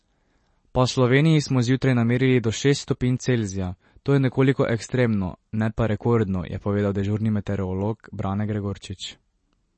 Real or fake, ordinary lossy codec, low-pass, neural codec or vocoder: real; MP3, 32 kbps; 10.8 kHz; none